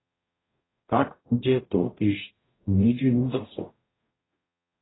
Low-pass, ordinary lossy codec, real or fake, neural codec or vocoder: 7.2 kHz; AAC, 16 kbps; fake; codec, 44.1 kHz, 0.9 kbps, DAC